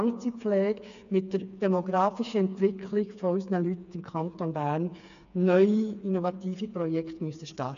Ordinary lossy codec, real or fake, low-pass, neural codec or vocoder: none; fake; 7.2 kHz; codec, 16 kHz, 4 kbps, FreqCodec, smaller model